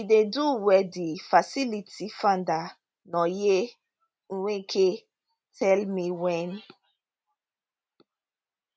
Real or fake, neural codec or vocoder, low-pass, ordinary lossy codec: real; none; none; none